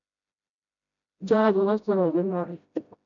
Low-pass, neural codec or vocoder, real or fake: 7.2 kHz; codec, 16 kHz, 0.5 kbps, FreqCodec, smaller model; fake